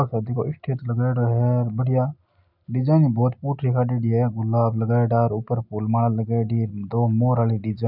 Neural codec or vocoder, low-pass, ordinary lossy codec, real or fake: none; 5.4 kHz; none; real